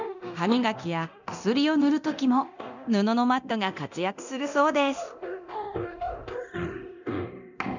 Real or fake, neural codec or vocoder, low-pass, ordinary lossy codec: fake; codec, 24 kHz, 0.9 kbps, DualCodec; 7.2 kHz; none